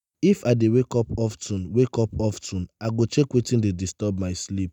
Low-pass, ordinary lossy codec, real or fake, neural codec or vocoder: 19.8 kHz; none; real; none